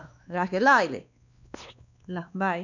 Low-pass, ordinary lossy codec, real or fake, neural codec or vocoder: 7.2 kHz; none; fake; codec, 16 kHz, 2 kbps, X-Codec, WavLM features, trained on Multilingual LibriSpeech